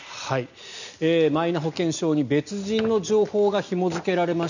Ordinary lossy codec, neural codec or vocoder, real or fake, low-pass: none; none; real; 7.2 kHz